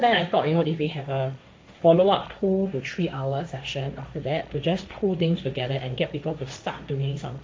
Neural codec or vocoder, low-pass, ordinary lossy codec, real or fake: codec, 16 kHz, 1.1 kbps, Voila-Tokenizer; none; none; fake